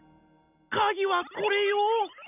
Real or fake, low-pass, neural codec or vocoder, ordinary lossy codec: real; 3.6 kHz; none; none